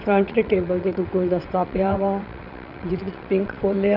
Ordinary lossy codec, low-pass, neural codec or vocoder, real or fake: none; 5.4 kHz; vocoder, 22.05 kHz, 80 mel bands, WaveNeXt; fake